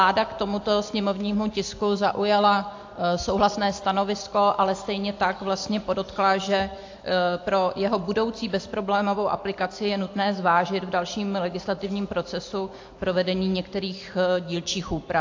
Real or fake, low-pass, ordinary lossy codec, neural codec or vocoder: real; 7.2 kHz; AAC, 48 kbps; none